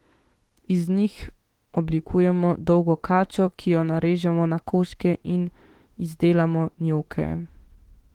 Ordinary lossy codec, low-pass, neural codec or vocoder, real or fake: Opus, 16 kbps; 19.8 kHz; autoencoder, 48 kHz, 32 numbers a frame, DAC-VAE, trained on Japanese speech; fake